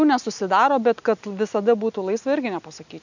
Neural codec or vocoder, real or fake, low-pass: none; real; 7.2 kHz